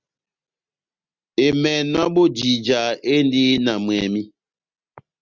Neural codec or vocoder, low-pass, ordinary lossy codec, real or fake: none; 7.2 kHz; Opus, 64 kbps; real